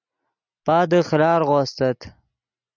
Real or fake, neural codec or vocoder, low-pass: real; none; 7.2 kHz